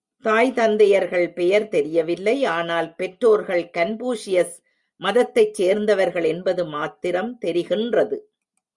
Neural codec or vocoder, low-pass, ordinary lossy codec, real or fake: none; 10.8 kHz; Opus, 64 kbps; real